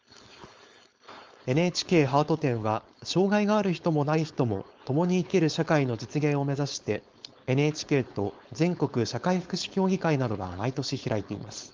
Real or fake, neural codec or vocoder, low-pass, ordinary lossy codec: fake; codec, 16 kHz, 4.8 kbps, FACodec; 7.2 kHz; Opus, 32 kbps